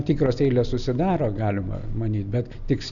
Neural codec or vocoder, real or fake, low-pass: none; real; 7.2 kHz